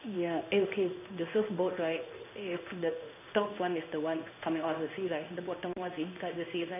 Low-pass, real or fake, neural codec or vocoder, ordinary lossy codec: 3.6 kHz; fake; codec, 16 kHz in and 24 kHz out, 1 kbps, XY-Tokenizer; none